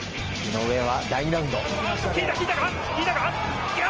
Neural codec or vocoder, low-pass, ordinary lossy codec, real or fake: none; 7.2 kHz; Opus, 24 kbps; real